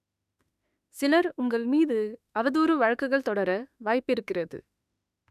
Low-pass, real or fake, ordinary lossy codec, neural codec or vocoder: 14.4 kHz; fake; none; autoencoder, 48 kHz, 32 numbers a frame, DAC-VAE, trained on Japanese speech